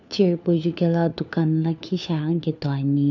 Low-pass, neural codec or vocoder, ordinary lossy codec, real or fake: 7.2 kHz; codec, 16 kHz, 4 kbps, FunCodec, trained on LibriTTS, 50 frames a second; none; fake